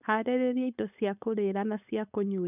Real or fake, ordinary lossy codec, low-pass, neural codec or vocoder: fake; none; 3.6 kHz; codec, 16 kHz, 4.8 kbps, FACodec